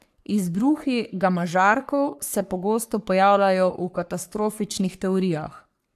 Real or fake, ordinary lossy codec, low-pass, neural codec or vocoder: fake; AAC, 96 kbps; 14.4 kHz; codec, 44.1 kHz, 3.4 kbps, Pupu-Codec